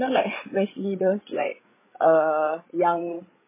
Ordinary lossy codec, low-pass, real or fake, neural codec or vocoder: MP3, 16 kbps; 3.6 kHz; fake; codec, 16 kHz, 8 kbps, FreqCodec, larger model